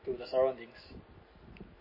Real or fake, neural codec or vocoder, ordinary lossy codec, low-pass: real; none; MP3, 24 kbps; 5.4 kHz